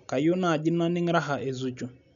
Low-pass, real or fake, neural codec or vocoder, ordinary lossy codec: 7.2 kHz; real; none; none